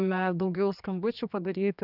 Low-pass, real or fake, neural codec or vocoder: 5.4 kHz; fake; codec, 16 kHz, 2 kbps, X-Codec, HuBERT features, trained on general audio